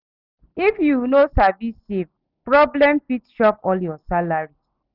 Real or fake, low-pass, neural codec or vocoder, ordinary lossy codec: real; 5.4 kHz; none; none